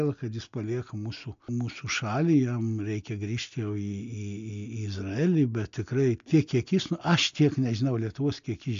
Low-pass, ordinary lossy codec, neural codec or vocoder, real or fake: 7.2 kHz; AAC, 64 kbps; none; real